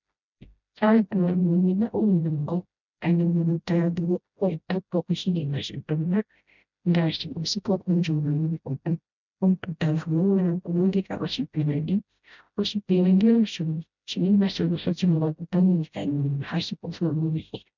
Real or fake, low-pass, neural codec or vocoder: fake; 7.2 kHz; codec, 16 kHz, 0.5 kbps, FreqCodec, smaller model